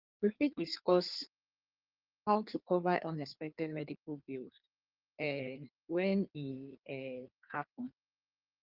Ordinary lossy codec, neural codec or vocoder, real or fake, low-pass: Opus, 32 kbps; codec, 16 kHz in and 24 kHz out, 1.1 kbps, FireRedTTS-2 codec; fake; 5.4 kHz